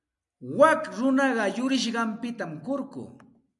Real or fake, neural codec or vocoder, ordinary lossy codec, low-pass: real; none; MP3, 96 kbps; 10.8 kHz